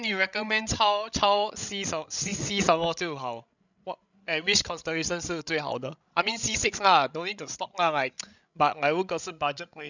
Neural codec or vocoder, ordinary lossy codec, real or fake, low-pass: codec, 16 kHz, 16 kbps, FreqCodec, larger model; none; fake; 7.2 kHz